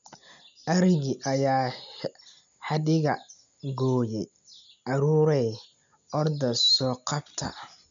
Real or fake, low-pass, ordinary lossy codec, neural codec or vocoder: real; 7.2 kHz; none; none